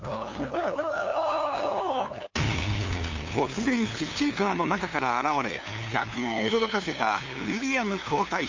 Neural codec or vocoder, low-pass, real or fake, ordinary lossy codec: codec, 16 kHz, 2 kbps, FunCodec, trained on LibriTTS, 25 frames a second; 7.2 kHz; fake; MP3, 48 kbps